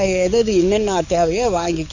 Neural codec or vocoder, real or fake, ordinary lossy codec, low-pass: codec, 44.1 kHz, 7.8 kbps, DAC; fake; none; 7.2 kHz